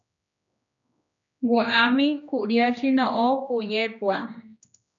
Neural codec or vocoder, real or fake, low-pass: codec, 16 kHz, 1 kbps, X-Codec, HuBERT features, trained on general audio; fake; 7.2 kHz